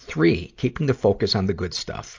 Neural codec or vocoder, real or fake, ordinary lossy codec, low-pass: none; real; AAC, 48 kbps; 7.2 kHz